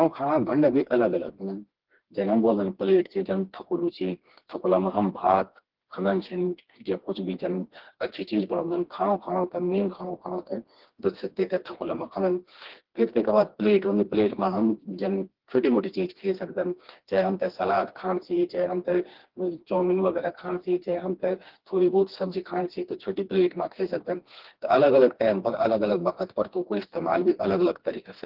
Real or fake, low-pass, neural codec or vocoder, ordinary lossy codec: fake; 5.4 kHz; codec, 16 kHz, 2 kbps, FreqCodec, smaller model; Opus, 16 kbps